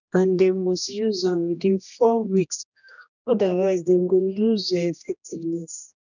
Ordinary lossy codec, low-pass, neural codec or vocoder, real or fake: none; 7.2 kHz; codec, 16 kHz, 1 kbps, X-Codec, HuBERT features, trained on general audio; fake